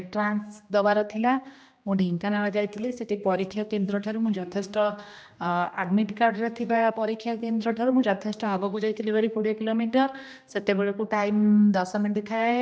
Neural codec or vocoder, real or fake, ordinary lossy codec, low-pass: codec, 16 kHz, 1 kbps, X-Codec, HuBERT features, trained on general audio; fake; none; none